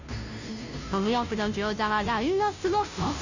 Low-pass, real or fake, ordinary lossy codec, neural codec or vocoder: 7.2 kHz; fake; none; codec, 16 kHz, 0.5 kbps, FunCodec, trained on Chinese and English, 25 frames a second